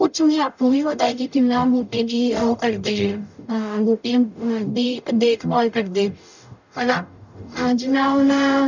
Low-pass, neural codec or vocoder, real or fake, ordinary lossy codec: 7.2 kHz; codec, 44.1 kHz, 0.9 kbps, DAC; fake; none